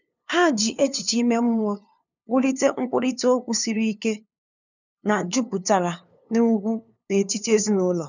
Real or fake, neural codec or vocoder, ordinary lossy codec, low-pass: fake; codec, 16 kHz, 2 kbps, FunCodec, trained on LibriTTS, 25 frames a second; none; 7.2 kHz